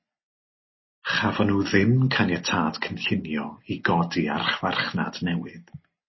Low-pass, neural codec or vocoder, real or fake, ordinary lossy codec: 7.2 kHz; none; real; MP3, 24 kbps